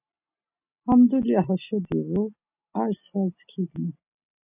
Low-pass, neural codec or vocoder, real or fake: 3.6 kHz; none; real